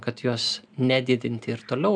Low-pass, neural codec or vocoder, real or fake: 9.9 kHz; none; real